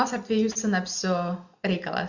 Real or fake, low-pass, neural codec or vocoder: real; 7.2 kHz; none